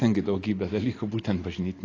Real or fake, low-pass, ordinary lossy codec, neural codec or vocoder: real; 7.2 kHz; AAC, 32 kbps; none